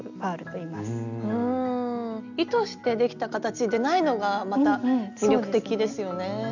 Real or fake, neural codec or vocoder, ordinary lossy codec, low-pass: real; none; none; 7.2 kHz